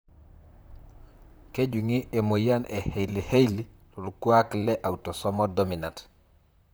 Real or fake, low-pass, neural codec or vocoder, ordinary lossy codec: fake; none; vocoder, 44.1 kHz, 128 mel bands, Pupu-Vocoder; none